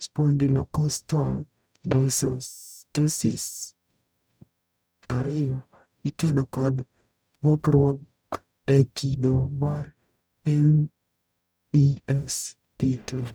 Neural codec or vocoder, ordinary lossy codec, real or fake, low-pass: codec, 44.1 kHz, 0.9 kbps, DAC; none; fake; none